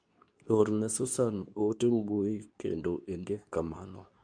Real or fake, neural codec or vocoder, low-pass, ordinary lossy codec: fake; codec, 24 kHz, 0.9 kbps, WavTokenizer, medium speech release version 2; 9.9 kHz; MP3, 96 kbps